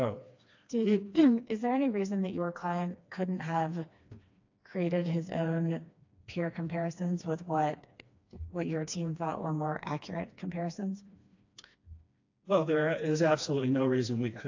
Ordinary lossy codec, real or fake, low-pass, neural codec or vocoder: AAC, 48 kbps; fake; 7.2 kHz; codec, 16 kHz, 2 kbps, FreqCodec, smaller model